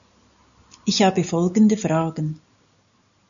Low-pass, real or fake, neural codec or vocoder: 7.2 kHz; real; none